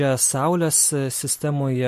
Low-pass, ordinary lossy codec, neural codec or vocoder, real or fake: 14.4 kHz; MP3, 64 kbps; none; real